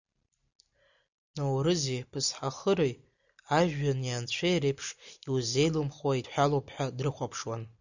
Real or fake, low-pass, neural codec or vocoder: real; 7.2 kHz; none